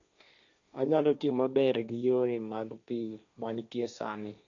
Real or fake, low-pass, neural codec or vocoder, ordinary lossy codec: fake; 7.2 kHz; codec, 16 kHz, 1.1 kbps, Voila-Tokenizer; MP3, 96 kbps